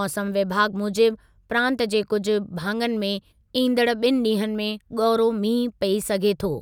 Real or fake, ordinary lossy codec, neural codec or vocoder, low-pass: real; none; none; 19.8 kHz